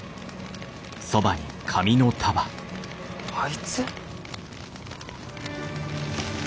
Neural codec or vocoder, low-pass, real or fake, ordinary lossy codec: none; none; real; none